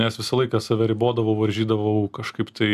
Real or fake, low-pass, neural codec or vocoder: real; 14.4 kHz; none